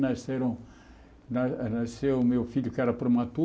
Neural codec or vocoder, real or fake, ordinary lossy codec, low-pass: none; real; none; none